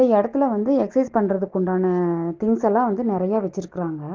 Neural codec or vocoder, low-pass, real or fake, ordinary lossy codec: none; 7.2 kHz; real; Opus, 16 kbps